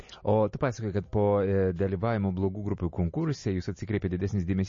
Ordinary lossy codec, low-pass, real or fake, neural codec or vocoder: MP3, 32 kbps; 7.2 kHz; real; none